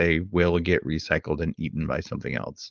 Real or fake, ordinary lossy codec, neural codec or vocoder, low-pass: fake; Opus, 32 kbps; codec, 16 kHz, 4.8 kbps, FACodec; 7.2 kHz